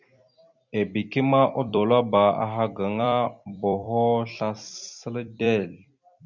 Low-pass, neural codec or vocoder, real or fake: 7.2 kHz; vocoder, 44.1 kHz, 128 mel bands every 256 samples, BigVGAN v2; fake